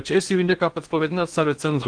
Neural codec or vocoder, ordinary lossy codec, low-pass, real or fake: codec, 16 kHz in and 24 kHz out, 0.8 kbps, FocalCodec, streaming, 65536 codes; Opus, 24 kbps; 9.9 kHz; fake